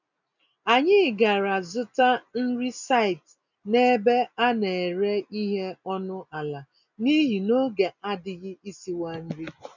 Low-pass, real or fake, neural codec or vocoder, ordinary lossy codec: 7.2 kHz; real; none; none